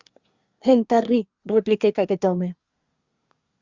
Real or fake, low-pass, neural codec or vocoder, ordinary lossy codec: fake; 7.2 kHz; codec, 24 kHz, 1 kbps, SNAC; Opus, 64 kbps